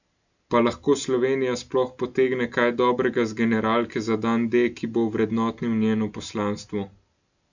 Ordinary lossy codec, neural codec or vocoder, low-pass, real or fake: none; none; 7.2 kHz; real